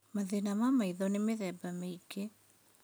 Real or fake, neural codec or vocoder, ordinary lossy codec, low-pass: real; none; none; none